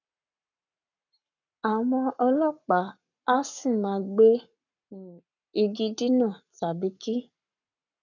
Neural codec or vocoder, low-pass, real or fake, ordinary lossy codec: codec, 44.1 kHz, 7.8 kbps, Pupu-Codec; 7.2 kHz; fake; none